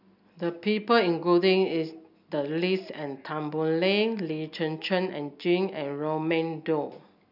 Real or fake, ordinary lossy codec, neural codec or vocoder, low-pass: real; none; none; 5.4 kHz